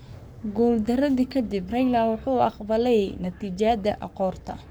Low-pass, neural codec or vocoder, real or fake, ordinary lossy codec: none; codec, 44.1 kHz, 7.8 kbps, Pupu-Codec; fake; none